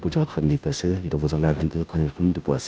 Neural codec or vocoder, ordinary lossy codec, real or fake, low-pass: codec, 16 kHz, 0.5 kbps, FunCodec, trained on Chinese and English, 25 frames a second; none; fake; none